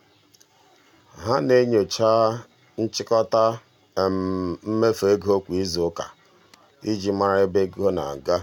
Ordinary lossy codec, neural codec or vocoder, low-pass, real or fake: MP3, 96 kbps; none; 19.8 kHz; real